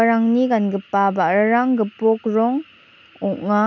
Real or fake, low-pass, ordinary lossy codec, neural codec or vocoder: real; 7.2 kHz; none; none